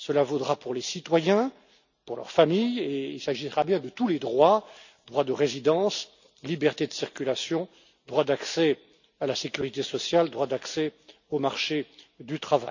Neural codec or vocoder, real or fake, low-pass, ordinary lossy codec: none; real; 7.2 kHz; none